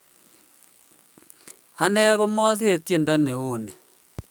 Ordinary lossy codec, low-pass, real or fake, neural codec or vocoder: none; none; fake; codec, 44.1 kHz, 2.6 kbps, SNAC